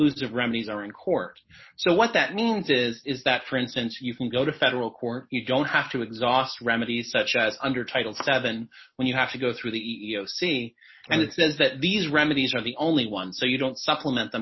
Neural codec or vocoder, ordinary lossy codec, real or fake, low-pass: none; MP3, 24 kbps; real; 7.2 kHz